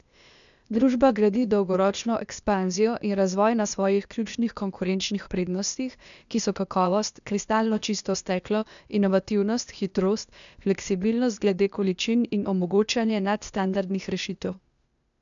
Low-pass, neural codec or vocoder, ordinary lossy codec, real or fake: 7.2 kHz; codec, 16 kHz, 0.8 kbps, ZipCodec; none; fake